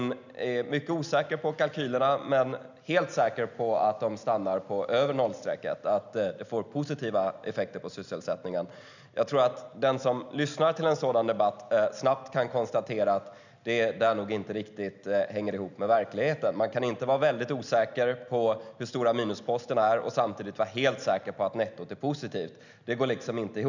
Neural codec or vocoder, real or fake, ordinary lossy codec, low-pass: none; real; none; 7.2 kHz